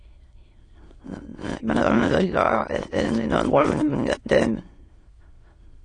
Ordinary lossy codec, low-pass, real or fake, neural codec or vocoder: AAC, 32 kbps; 9.9 kHz; fake; autoencoder, 22.05 kHz, a latent of 192 numbers a frame, VITS, trained on many speakers